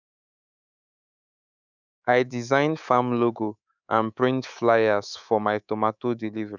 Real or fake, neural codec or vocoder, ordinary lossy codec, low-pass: fake; codec, 24 kHz, 3.1 kbps, DualCodec; none; 7.2 kHz